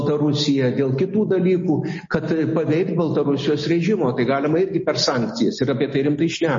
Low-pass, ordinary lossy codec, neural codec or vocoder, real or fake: 7.2 kHz; MP3, 32 kbps; none; real